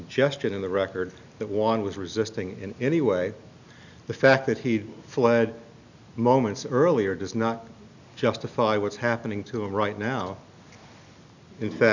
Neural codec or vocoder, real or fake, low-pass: none; real; 7.2 kHz